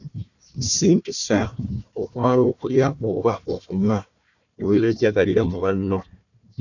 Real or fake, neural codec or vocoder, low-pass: fake; codec, 16 kHz, 1 kbps, FunCodec, trained on Chinese and English, 50 frames a second; 7.2 kHz